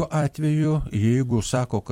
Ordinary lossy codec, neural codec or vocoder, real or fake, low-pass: MP3, 64 kbps; vocoder, 44.1 kHz, 128 mel bands every 256 samples, BigVGAN v2; fake; 19.8 kHz